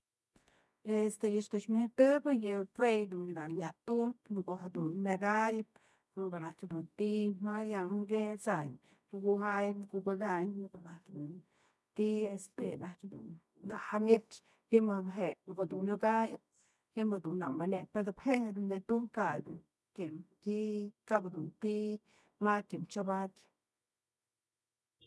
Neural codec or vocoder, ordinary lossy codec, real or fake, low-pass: codec, 24 kHz, 0.9 kbps, WavTokenizer, medium music audio release; none; fake; none